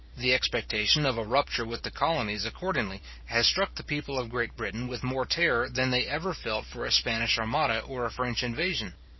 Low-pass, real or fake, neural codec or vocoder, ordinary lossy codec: 7.2 kHz; fake; vocoder, 44.1 kHz, 128 mel bands every 512 samples, BigVGAN v2; MP3, 24 kbps